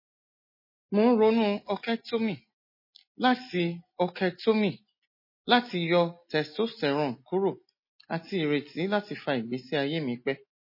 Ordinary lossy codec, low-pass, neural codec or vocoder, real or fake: MP3, 24 kbps; 5.4 kHz; none; real